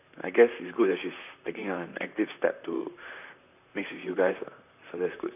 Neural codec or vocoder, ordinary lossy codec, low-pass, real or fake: vocoder, 44.1 kHz, 128 mel bands, Pupu-Vocoder; none; 3.6 kHz; fake